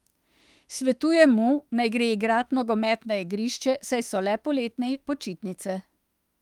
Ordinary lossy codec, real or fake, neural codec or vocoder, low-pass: Opus, 32 kbps; fake; autoencoder, 48 kHz, 32 numbers a frame, DAC-VAE, trained on Japanese speech; 19.8 kHz